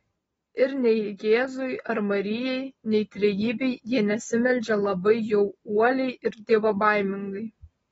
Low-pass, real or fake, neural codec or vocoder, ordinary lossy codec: 14.4 kHz; real; none; AAC, 24 kbps